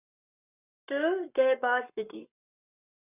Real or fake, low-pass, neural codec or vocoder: fake; 3.6 kHz; codec, 44.1 kHz, 7.8 kbps, Pupu-Codec